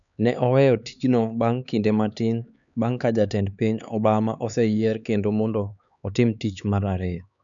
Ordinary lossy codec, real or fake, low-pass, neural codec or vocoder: none; fake; 7.2 kHz; codec, 16 kHz, 4 kbps, X-Codec, HuBERT features, trained on LibriSpeech